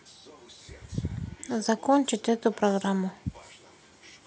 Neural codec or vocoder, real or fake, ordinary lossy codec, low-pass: none; real; none; none